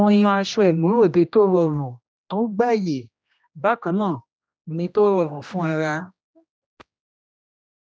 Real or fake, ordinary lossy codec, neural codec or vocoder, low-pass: fake; none; codec, 16 kHz, 1 kbps, X-Codec, HuBERT features, trained on general audio; none